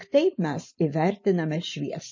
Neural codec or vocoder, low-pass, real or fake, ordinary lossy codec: none; 7.2 kHz; real; MP3, 32 kbps